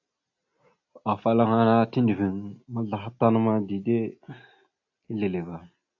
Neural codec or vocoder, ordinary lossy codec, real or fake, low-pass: none; AAC, 48 kbps; real; 7.2 kHz